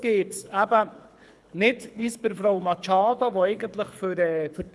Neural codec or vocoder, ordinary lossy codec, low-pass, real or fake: codec, 24 kHz, 6 kbps, HILCodec; none; none; fake